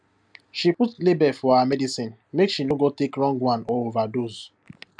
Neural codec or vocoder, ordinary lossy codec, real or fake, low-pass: none; none; real; 9.9 kHz